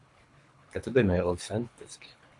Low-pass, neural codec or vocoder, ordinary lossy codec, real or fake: 10.8 kHz; codec, 24 kHz, 3 kbps, HILCodec; AAC, 64 kbps; fake